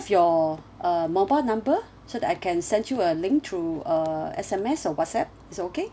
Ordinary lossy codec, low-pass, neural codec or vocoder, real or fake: none; none; none; real